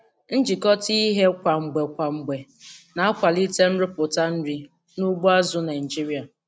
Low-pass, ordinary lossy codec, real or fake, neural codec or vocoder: none; none; real; none